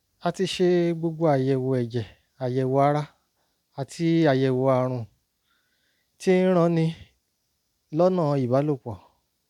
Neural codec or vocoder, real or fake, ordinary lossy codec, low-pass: none; real; none; 19.8 kHz